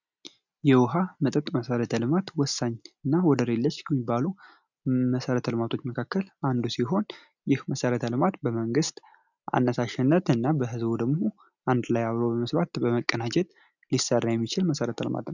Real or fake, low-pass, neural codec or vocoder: real; 7.2 kHz; none